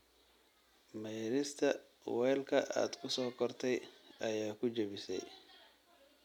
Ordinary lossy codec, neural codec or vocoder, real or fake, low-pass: none; none; real; 19.8 kHz